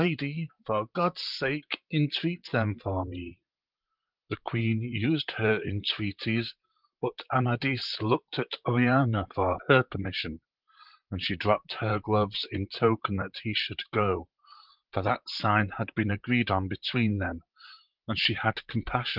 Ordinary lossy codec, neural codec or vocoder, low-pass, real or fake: Opus, 32 kbps; vocoder, 44.1 kHz, 128 mel bands, Pupu-Vocoder; 5.4 kHz; fake